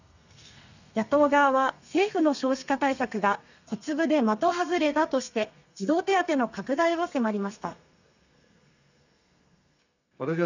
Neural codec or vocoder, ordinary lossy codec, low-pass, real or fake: codec, 32 kHz, 1.9 kbps, SNAC; none; 7.2 kHz; fake